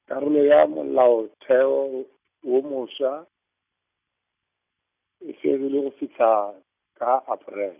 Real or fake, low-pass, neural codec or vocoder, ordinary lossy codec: real; 3.6 kHz; none; none